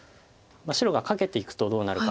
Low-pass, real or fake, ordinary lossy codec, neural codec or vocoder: none; real; none; none